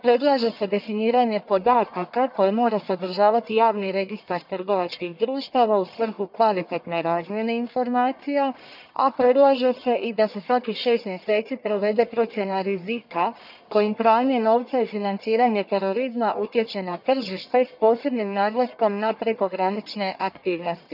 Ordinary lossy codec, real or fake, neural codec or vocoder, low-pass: none; fake; codec, 44.1 kHz, 1.7 kbps, Pupu-Codec; 5.4 kHz